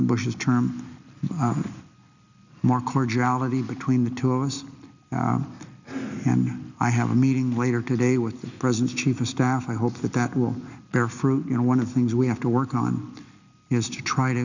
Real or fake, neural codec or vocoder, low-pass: real; none; 7.2 kHz